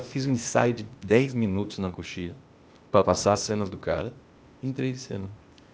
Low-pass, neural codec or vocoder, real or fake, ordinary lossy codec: none; codec, 16 kHz, 0.8 kbps, ZipCodec; fake; none